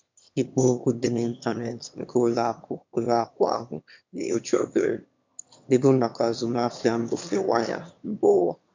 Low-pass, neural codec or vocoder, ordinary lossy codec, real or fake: 7.2 kHz; autoencoder, 22.05 kHz, a latent of 192 numbers a frame, VITS, trained on one speaker; MP3, 64 kbps; fake